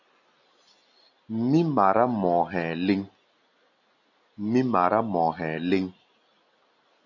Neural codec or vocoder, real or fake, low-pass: none; real; 7.2 kHz